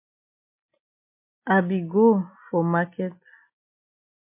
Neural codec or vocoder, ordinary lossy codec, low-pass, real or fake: none; MP3, 24 kbps; 3.6 kHz; real